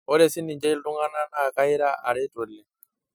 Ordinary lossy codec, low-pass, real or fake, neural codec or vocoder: none; none; real; none